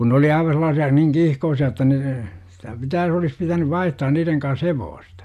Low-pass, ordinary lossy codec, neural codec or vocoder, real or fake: 14.4 kHz; none; none; real